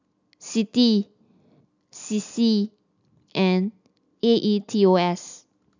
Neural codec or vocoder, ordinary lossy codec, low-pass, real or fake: none; none; 7.2 kHz; real